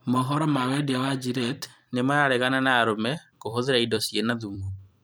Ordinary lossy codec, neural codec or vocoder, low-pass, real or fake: none; vocoder, 44.1 kHz, 128 mel bands every 256 samples, BigVGAN v2; none; fake